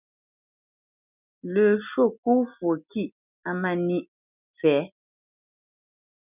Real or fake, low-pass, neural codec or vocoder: real; 3.6 kHz; none